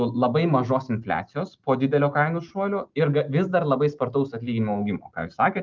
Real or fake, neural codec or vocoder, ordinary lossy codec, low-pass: real; none; Opus, 32 kbps; 7.2 kHz